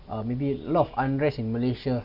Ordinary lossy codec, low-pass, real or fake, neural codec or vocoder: none; 5.4 kHz; real; none